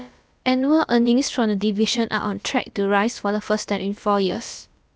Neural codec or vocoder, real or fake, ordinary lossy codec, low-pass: codec, 16 kHz, about 1 kbps, DyCAST, with the encoder's durations; fake; none; none